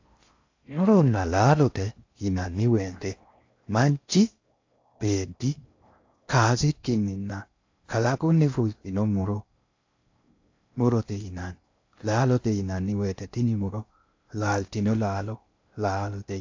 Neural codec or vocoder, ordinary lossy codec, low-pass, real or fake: codec, 16 kHz in and 24 kHz out, 0.6 kbps, FocalCodec, streaming, 4096 codes; AAC, 48 kbps; 7.2 kHz; fake